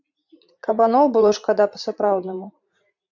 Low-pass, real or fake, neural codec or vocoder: 7.2 kHz; fake; vocoder, 44.1 kHz, 80 mel bands, Vocos